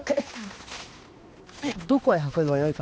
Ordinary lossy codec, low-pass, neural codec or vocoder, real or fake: none; none; codec, 16 kHz, 2 kbps, X-Codec, HuBERT features, trained on balanced general audio; fake